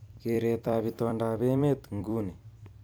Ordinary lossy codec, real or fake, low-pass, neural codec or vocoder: none; fake; none; vocoder, 44.1 kHz, 128 mel bands every 256 samples, BigVGAN v2